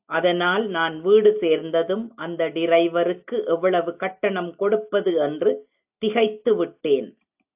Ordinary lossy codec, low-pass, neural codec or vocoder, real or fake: AAC, 32 kbps; 3.6 kHz; none; real